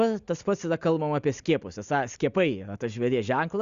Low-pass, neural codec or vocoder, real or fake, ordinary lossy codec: 7.2 kHz; none; real; Opus, 64 kbps